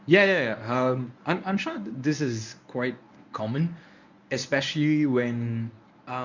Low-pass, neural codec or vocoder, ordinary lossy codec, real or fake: 7.2 kHz; codec, 24 kHz, 0.9 kbps, WavTokenizer, medium speech release version 1; none; fake